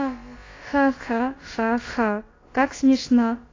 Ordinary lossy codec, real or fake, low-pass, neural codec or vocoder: AAC, 32 kbps; fake; 7.2 kHz; codec, 16 kHz, about 1 kbps, DyCAST, with the encoder's durations